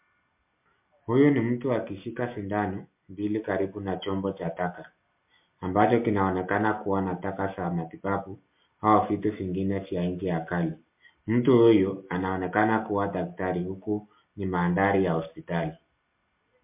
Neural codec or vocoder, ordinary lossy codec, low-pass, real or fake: none; MP3, 32 kbps; 3.6 kHz; real